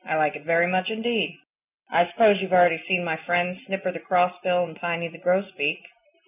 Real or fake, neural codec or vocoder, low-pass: real; none; 3.6 kHz